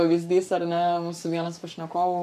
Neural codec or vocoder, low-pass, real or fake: codec, 44.1 kHz, 7.8 kbps, Pupu-Codec; 14.4 kHz; fake